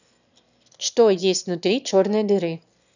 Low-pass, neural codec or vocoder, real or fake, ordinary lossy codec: 7.2 kHz; autoencoder, 22.05 kHz, a latent of 192 numbers a frame, VITS, trained on one speaker; fake; none